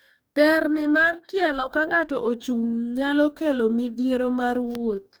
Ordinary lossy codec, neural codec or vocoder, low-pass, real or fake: none; codec, 44.1 kHz, 2.6 kbps, DAC; none; fake